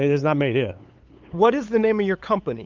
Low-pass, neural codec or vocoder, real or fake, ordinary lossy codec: 7.2 kHz; codec, 16 kHz, 8 kbps, FunCodec, trained on LibriTTS, 25 frames a second; fake; Opus, 32 kbps